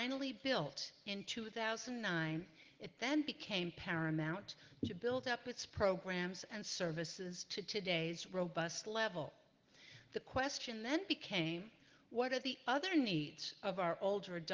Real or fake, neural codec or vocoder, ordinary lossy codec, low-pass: real; none; Opus, 16 kbps; 7.2 kHz